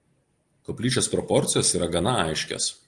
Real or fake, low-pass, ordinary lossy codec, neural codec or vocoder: real; 10.8 kHz; Opus, 24 kbps; none